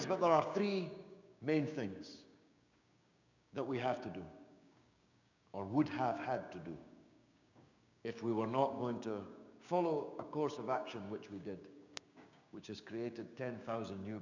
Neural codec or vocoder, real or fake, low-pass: codec, 16 kHz, 6 kbps, DAC; fake; 7.2 kHz